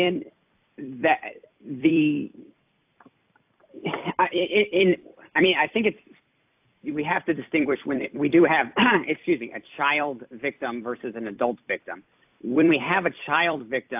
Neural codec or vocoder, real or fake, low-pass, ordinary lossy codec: vocoder, 44.1 kHz, 128 mel bands every 256 samples, BigVGAN v2; fake; 3.6 kHz; AAC, 32 kbps